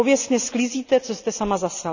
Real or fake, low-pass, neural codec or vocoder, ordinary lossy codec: real; 7.2 kHz; none; none